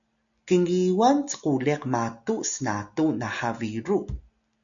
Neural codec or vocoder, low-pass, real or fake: none; 7.2 kHz; real